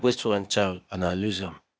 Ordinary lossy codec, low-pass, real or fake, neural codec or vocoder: none; none; fake; codec, 16 kHz, 0.8 kbps, ZipCodec